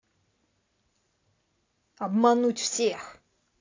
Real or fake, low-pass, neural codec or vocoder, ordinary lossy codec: real; 7.2 kHz; none; AAC, 32 kbps